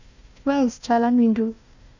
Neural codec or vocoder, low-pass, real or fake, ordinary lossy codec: codec, 16 kHz, 1 kbps, FunCodec, trained on Chinese and English, 50 frames a second; 7.2 kHz; fake; none